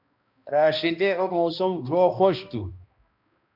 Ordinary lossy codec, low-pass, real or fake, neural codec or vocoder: MP3, 48 kbps; 5.4 kHz; fake; codec, 16 kHz, 1 kbps, X-Codec, HuBERT features, trained on balanced general audio